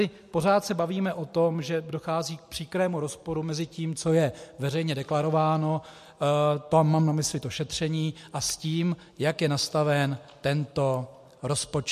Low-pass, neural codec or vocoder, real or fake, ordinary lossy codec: 14.4 kHz; none; real; MP3, 64 kbps